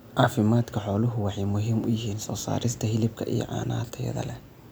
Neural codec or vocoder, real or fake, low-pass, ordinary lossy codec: none; real; none; none